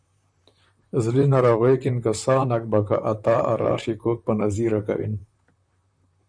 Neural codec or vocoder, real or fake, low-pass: vocoder, 44.1 kHz, 128 mel bands, Pupu-Vocoder; fake; 9.9 kHz